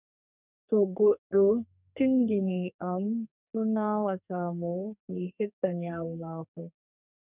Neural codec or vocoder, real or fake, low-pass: codec, 32 kHz, 1.9 kbps, SNAC; fake; 3.6 kHz